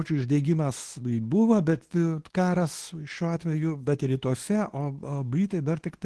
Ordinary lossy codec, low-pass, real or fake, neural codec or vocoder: Opus, 16 kbps; 10.8 kHz; fake; codec, 24 kHz, 0.9 kbps, WavTokenizer, medium speech release version 2